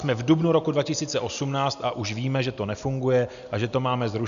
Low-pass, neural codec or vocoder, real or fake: 7.2 kHz; none; real